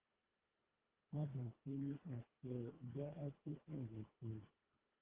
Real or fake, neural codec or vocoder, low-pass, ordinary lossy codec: fake; codec, 24 kHz, 1.5 kbps, HILCodec; 3.6 kHz; Opus, 16 kbps